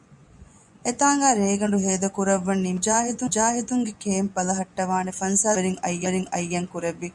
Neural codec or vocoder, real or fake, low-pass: vocoder, 24 kHz, 100 mel bands, Vocos; fake; 10.8 kHz